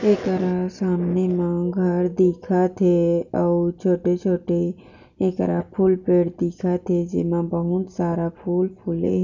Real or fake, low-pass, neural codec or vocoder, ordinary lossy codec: real; 7.2 kHz; none; none